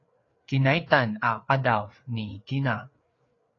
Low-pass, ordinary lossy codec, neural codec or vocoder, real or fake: 7.2 kHz; AAC, 32 kbps; codec, 16 kHz, 4 kbps, FreqCodec, larger model; fake